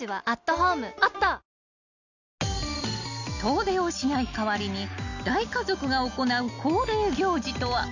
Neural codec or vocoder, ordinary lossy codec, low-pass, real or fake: none; none; 7.2 kHz; real